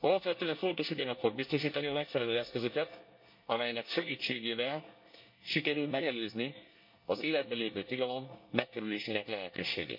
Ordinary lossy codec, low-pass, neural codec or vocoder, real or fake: MP3, 32 kbps; 5.4 kHz; codec, 24 kHz, 1 kbps, SNAC; fake